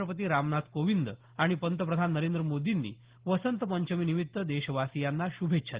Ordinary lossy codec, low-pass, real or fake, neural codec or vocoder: Opus, 16 kbps; 3.6 kHz; real; none